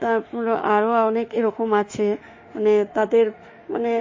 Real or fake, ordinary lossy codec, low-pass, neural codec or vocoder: fake; MP3, 32 kbps; 7.2 kHz; autoencoder, 48 kHz, 32 numbers a frame, DAC-VAE, trained on Japanese speech